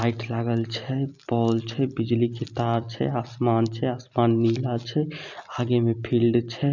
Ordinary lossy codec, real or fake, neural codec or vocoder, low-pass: none; real; none; 7.2 kHz